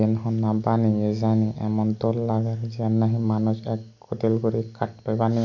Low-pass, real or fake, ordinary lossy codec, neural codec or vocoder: 7.2 kHz; real; none; none